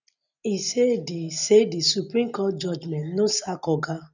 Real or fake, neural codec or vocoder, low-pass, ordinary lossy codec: real; none; 7.2 kHz; none